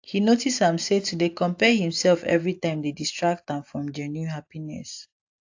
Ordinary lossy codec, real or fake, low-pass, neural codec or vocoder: AAC, 48 kbps; real; 7.2 kHz; none